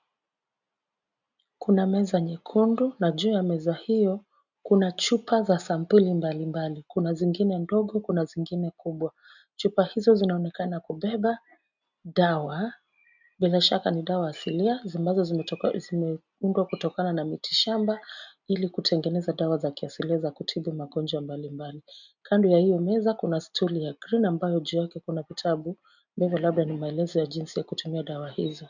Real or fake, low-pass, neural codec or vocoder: real; 7.2 kHz; none